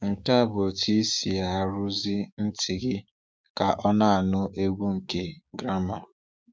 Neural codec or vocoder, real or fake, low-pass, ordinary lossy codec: codec, 16 kHz, 6 kbps, DAC; fake; none; none